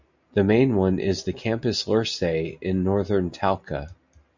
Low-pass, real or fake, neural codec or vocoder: 7.2 kHz; real; none